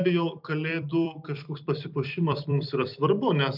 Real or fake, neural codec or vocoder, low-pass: fake; vocoder, 44.1 kHz, 128 mel bands every 256 samples, BigVGAN v2; 5.4 kHz